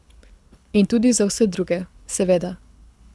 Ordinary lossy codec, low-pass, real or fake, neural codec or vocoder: none; none; fake; codec, 24 kHz, 6 kbps, HILCodec